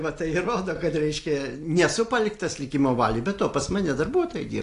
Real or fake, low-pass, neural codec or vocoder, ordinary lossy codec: real; 10.8 kHz; none; AAC, 48 kbps